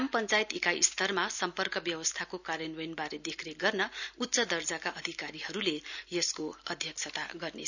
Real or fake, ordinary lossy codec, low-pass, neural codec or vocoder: real; none; 7.2 kHz; none